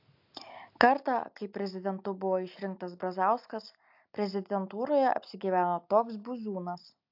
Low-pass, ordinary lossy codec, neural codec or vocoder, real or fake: 5.4 kHz; AAC, 48 kbps; none; real